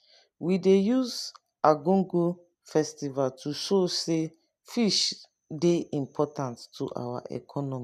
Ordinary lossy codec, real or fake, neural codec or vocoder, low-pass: none; real; none; 14.4 kHz